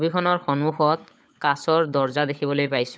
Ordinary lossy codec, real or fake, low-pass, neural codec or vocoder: none; fake; none; codec, 16 kHz, 16 kbps, FunCodec, trained on LibriTTS, 50 frames a second